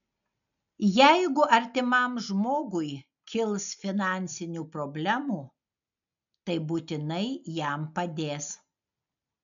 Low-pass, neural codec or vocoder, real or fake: 7.2 kHz; none; real